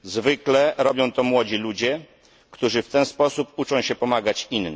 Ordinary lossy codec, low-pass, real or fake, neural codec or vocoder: none; none; real; none